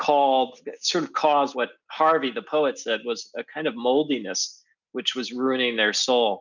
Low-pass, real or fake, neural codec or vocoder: 7.2 kHz; real; none